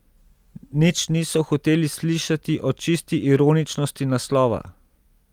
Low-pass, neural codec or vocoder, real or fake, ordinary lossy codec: 19.8 kHz; none; real; Opus, 32 kbps